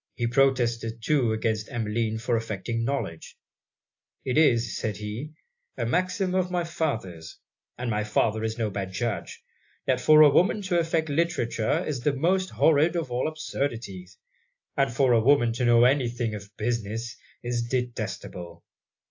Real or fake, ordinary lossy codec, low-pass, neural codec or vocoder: real; AAC, 48 kbps; 7.2 kHz; none